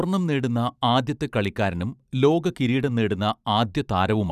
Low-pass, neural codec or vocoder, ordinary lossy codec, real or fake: 14.4 kHz; vocoder, 44.1 kHz, 128 mel bands every 512 samples, BigVGAN v2; none; fake